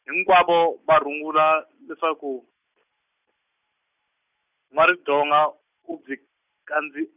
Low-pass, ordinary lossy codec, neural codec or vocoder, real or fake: 3.6 kHz; none; none; real